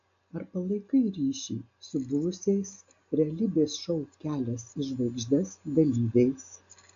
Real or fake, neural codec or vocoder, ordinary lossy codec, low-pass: real; none; AAC, 64 kbps; 7.2 kHz